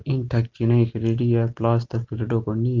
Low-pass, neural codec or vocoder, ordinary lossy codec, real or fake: 7.2 kHz; none; Opus, 32 kbps; real